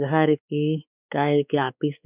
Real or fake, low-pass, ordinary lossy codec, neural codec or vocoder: fake; 3.6 kHz; none; codec, 16 kHz, 4 kbps, X-Codec, WavLM features, trained on Multilingual LibriSpeech